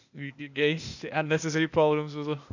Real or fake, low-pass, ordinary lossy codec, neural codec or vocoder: fake; 7.2 kHz; none; codec, 16 kHz, 0.8 kbps, ZipCodec